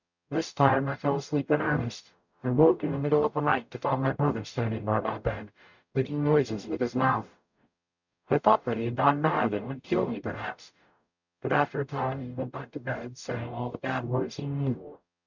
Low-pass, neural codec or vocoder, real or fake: 7.2 kHz; codec, 44.1 kHz, 0.9 kbps, DAC; fake